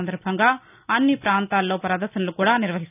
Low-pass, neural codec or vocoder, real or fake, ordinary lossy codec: 3.6 kHz; none; real; none